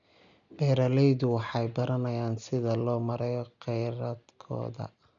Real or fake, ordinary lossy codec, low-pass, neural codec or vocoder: real; none; 7.2 kHz; none